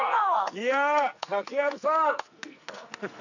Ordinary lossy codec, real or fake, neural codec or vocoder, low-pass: none; fake; codec, 44.1 kHz, 2.6 kbps, SNAC; 7.2 kHz